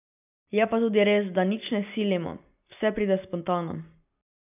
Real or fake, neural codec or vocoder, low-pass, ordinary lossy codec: real; none; 3.6 kHz; none